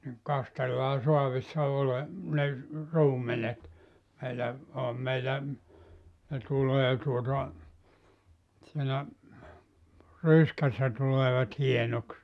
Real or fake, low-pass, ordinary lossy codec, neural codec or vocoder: real; none; none; none